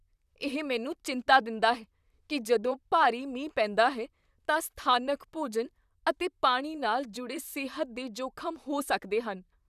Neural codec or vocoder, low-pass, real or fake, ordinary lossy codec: vocoder, 44.1 kHz, 128 mel bands, Pupu-Vocoder; 14.4 kHz; fake; none